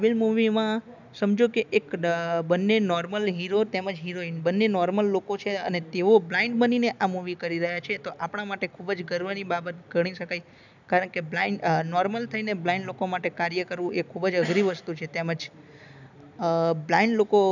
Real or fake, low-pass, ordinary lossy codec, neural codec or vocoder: real; 7.2 kHz; none; none